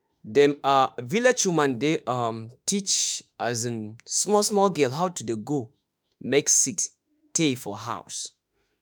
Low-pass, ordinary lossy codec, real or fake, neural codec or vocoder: none; none; fake; autoencoder, 48 kHz, 32 numbers a frame, DAC-VAE, trained on Japanese speech